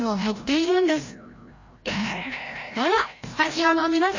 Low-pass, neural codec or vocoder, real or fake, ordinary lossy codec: 7.2 kHz; codec, 16 kHz, 0.5 kbps, FreqCodec, larger model; fake; MP3, 32 kbps